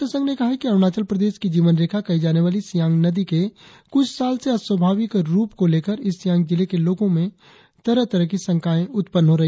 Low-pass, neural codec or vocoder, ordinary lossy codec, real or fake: none; none; none; real